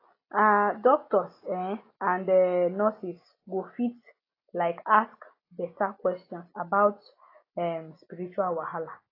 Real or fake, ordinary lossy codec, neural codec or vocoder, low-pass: real; AAC, 24 kbps; none; 5.4 kHz